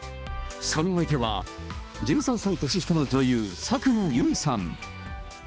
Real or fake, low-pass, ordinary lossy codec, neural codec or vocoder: fake; none; none; codec, 16 kHz, 2 kbps, X-Codec, HuBERT features, trained on balanced general audio